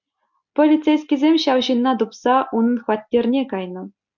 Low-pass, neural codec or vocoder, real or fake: 7.2 kHz; none; real